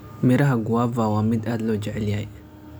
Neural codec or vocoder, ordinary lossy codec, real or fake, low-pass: none; none; real; none